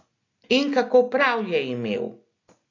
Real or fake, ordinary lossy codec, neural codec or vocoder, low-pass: real; AAC, 32 kbps; none; 7.2 kHz